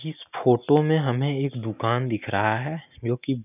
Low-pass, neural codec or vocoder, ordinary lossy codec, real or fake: 3.6 kHz; none; none; real